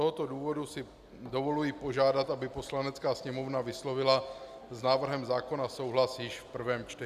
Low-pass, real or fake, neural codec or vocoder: 14.4 kHz; real; none